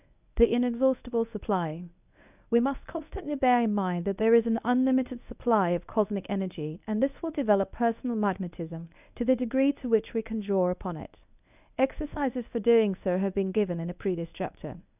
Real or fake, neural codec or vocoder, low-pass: fake; codec, 24 kHz, 0.9 kbps, WavTokenizer, medium speech release version 1; 3.6 kHz